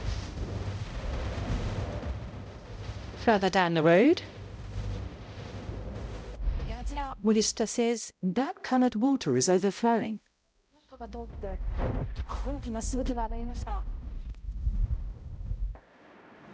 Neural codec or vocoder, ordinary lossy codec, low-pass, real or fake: codec, 16 kHz, 0.5 kbps, X-Codec, HuBERT features, trained on balanced general audio; none; none; fake